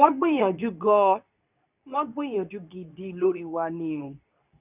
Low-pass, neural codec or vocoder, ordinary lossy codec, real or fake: 3.6 kHz; codec, 24 kHz, 0.9 kbps, WavTokenizer, medium speech release version 2; none; fake